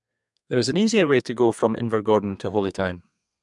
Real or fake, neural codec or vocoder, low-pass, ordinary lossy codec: fake; codec, 32 kHz, 1.9 kbps, SNAC; 10.8 kHz; MP3, 96 kbps